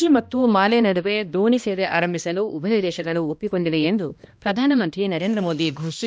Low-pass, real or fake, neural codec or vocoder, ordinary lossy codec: none; fake; codec, 16 kHz, 1 kbps, X-Codec, HuBERT features, trained on balanced general audio; none